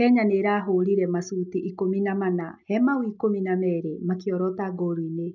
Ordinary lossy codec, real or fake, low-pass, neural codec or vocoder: none; real; 7.2 kHz; none